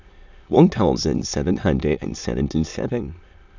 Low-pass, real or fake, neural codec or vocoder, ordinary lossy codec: 7.2 kHz; fake; autoencoder, 22.05 kHz, a latent of 192 numbers a frame, VITS, trained on many speakers; none